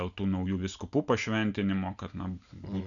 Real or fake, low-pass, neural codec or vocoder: real; 7.2 kHz; none